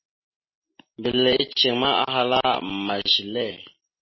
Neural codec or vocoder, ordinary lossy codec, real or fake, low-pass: none; MP3, 24 kbps; real; 7.2 kHz